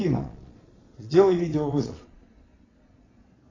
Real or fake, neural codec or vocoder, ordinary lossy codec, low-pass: fake; vocoder, 22.05 kHz, 80 mel bands, Vocos; AAC, 32 kbps; 7.2 kHz